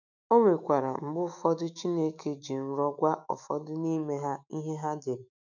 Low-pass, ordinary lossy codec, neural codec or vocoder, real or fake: 7.2 kHz; none; autoencoder, 48 kHz, 128 numbers a frame, DAC-VAE, trained on Japanese speech; fake